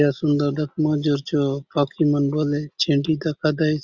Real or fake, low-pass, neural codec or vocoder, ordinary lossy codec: real; 7.2 kHz; none; Opus, 64 kbps